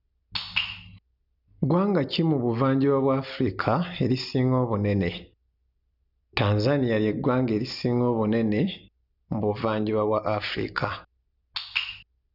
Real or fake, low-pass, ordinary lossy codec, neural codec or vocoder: real; 5.4 kHz; none; none